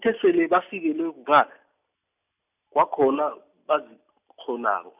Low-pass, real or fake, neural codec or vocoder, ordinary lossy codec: 3.6 kHz; real; none; none